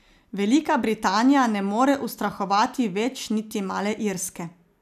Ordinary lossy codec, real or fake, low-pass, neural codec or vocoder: none; real; 14.4 kHz; none